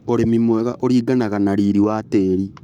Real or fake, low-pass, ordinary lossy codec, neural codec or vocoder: fake; 19.8 kHz; none; codec, 44.1 kHz, 7.8 kbps, Pupu-Codec